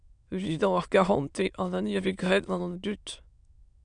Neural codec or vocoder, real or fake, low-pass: autoencoder, 22.05 kHz, a latent of 192 numbers a frame, VITS, trained on many speakers; fake; 9.9 kHz